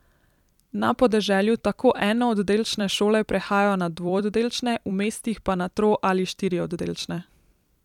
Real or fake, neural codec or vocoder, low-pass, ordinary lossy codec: fake; vocoder, 44.1 kHz, 128 mel bands every 256 samples, BigVGAN v2; 19.8 kHz; none